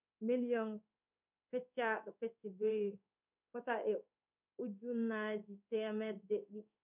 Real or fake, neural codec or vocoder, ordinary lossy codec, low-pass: fake; codec, 16 kHz in and 24 kHz out, 1 kbps, XY-Tokenizer; none; 3.6 kHz